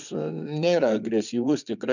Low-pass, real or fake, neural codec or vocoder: 7.2 kHz; fake; vocoder, 22.05 kHz, 80 mel bands, Vocos